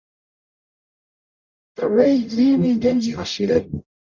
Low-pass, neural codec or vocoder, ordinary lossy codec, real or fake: 7.2 kHz; codec, 44.1 kHz, 0.9 kbps, DAC; Opus, 64 kbps; fake